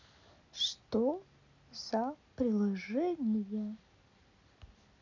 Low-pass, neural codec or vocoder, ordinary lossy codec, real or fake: 7.2 kHz; none; none; real